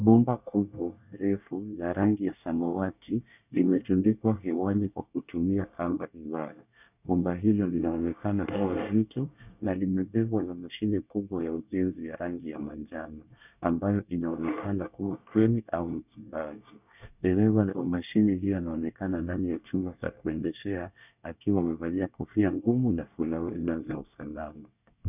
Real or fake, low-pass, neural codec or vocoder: fake; 3.6 kHz; codec, 24 kHz, 1 kbps, SNAC